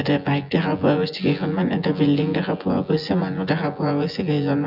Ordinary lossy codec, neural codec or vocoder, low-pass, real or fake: none; vocoder, 24 kHz, 100 mel bands, Vocos; 5.4 kHz; fake